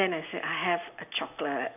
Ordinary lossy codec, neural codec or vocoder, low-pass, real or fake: none; none; 3.6 kHz; real